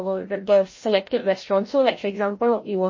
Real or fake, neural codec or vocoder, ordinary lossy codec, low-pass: fake; codec, 16 kHz, 0.5 kbps, FreqCodec, larger model; MP3, 32 kbps; 7.2 kHz